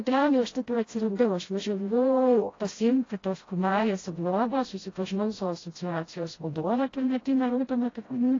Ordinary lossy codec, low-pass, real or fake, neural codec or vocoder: AAC, 32 kbps; 7.2 kHz; fake; codec, 16 kHz, 0.5 kbps, FreqCodec, smaller model